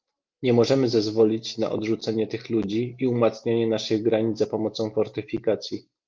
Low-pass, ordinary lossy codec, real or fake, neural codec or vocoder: 7.2 kHz; Opus, 24 kbps; real; none